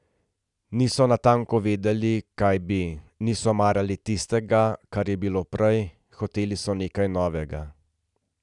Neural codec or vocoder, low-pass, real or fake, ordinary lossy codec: none; 10.8 kHz; real; none